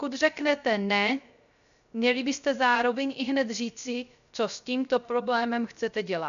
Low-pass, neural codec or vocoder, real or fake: 7.2 kHz; codec, 16 kHz, 0.3 kbps, FocalCodec; fake